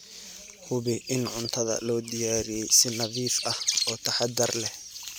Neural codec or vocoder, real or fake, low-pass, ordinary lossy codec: none; real; none; none